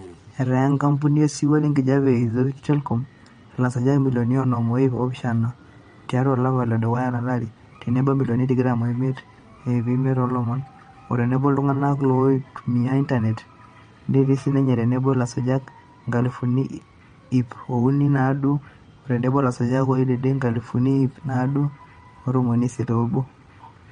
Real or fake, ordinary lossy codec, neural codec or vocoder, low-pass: fake; MP3, 48 kbps; vocoder, 22.05 kHz, 80 mel bands, WaveNeXt; 9.9 kHz